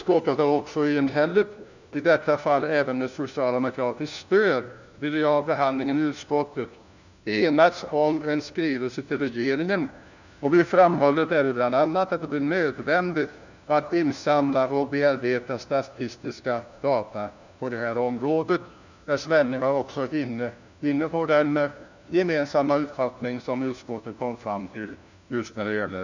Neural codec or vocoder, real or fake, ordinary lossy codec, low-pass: codec, 16 kHz, 1 kbps, FunCodec, trained on LibriTTS, 50 frames a second; fake; none; 7.2 kHz